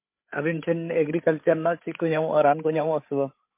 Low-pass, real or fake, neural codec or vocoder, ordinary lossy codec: 3.6 kHz; fake; codec, 16 kHz, 16 kbps, FreqCodec, larger model; MP3, 24 kbps